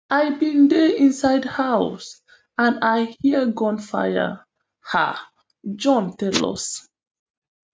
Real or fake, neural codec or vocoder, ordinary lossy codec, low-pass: real; none; none; none